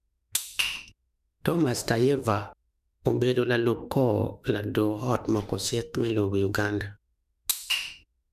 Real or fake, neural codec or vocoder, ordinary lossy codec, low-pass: fake; autoencoder, 48 kHz, 32 numbers a frame, DAC-VAE, trained on Japanese speech; none; 14.4 kHz